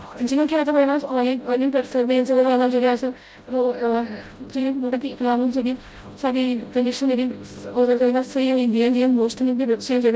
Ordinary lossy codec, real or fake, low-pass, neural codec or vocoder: none; fake; none; codec, 16 kHz, 0.5 kbps, FreqCodec, smaller model